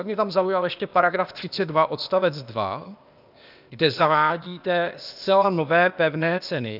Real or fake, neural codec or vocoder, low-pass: fake; codec, 16 kHz, 0.8 kbps, ZipCodec; 5.4 kHz